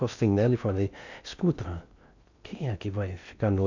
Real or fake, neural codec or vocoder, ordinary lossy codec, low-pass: fake; codec, 16 kHz in and 24 kHz out, 0.6 kbps, FocalCodec, streaming, 4096 codes; none; 7.2 kHz